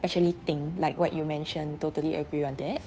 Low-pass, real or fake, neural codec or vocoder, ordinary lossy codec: none; fake; codec, 16 kHz, 2 kbps, FunCodec, trained on Chinese and English, 25 frames a second; none